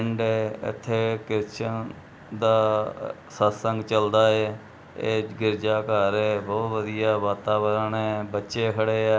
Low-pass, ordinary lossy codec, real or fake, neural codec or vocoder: none; none; real; none